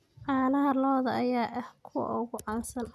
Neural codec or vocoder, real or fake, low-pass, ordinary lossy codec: none; real; 14.4 kHz; none